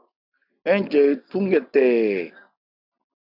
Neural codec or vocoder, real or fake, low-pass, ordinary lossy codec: none; real; 5.4 kHz; AAC, 48 kbps